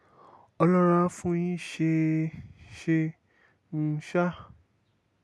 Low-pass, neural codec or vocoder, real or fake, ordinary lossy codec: none; none; real; none